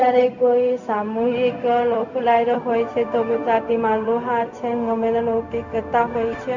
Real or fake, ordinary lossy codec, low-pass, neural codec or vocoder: fake; none; 7.2 kHz; codec, 16 kHz, 0.4 kbps, LongCat-Audio-Codec